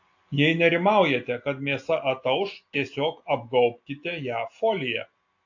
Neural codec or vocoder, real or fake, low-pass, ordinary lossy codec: none; real; 7.2 kHz; AAC, 48 kbps